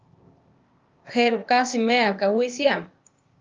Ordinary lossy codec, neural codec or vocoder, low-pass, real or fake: Opus, 24 kbps; codec, 16 kHz, 0.8 kbps, ZipCodec; 7.2 kHz; fake